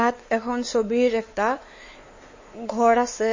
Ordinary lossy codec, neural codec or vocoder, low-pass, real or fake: MP3, 32 kbps; codec, 16 kHz, 4 kbps, X-Codec, WavLM features, trained on Multilingual LibriSpeech; 7.2 kHz; fake